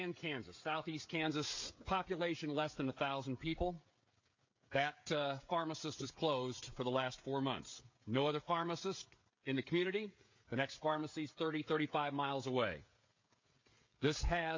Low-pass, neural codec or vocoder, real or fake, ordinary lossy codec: 7.2 kHz; codec, 16 kHz, 16 kbps, FreqCodec, smaller model; fake; MP3, 48 kbps